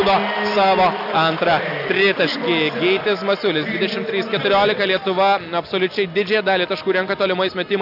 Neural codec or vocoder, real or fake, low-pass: none; real; 5.4 kHz